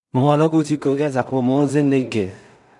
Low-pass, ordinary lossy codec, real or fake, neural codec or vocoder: 10.8 kHz; none; fake; codec, 16 kHz in and 24 kHz out, 0.4 kbps, LongCat-Audio-Codec, two codebook decoder